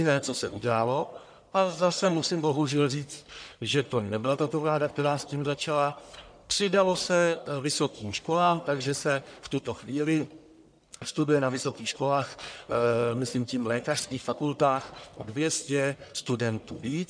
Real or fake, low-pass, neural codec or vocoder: fake; 9.9 kHz; codec, 44.1 kHz, 1.7 kbps, Pupu-Codec